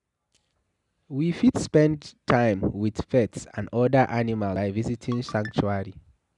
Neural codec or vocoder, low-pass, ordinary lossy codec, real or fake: none; 10.8 kHz; none; real